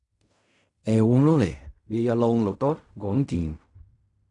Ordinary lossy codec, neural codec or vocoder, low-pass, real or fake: none; codec, 16 kHz in and 24 kHz out, 0.4 kbps, LongCat-Audio-Codec, fine tuned four codebook decoder; 10.8 kHz; fake